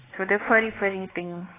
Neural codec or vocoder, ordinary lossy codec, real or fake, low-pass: codec, 16 kHz, 4 kbps, X-Codec, WavLM features, trained on Multilingual LibriSpeech; AAC, 16 kbps; fake; 3.6 kHz